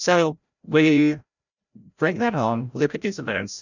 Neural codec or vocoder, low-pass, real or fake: codec, 16 kHz, 0.5 kbps, FreqCodec, larger model; 7.2 kHz; fake